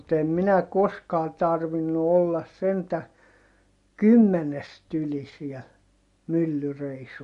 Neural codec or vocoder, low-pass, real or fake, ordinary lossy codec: none; 10.8 kHz; real; MP3, 48 kbps